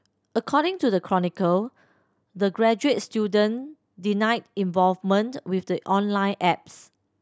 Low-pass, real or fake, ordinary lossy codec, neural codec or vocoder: none; real; none; none